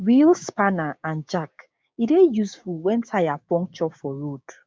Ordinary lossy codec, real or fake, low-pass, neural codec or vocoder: none; fake; none; codec, 16 kHz, 6 kbps, DAC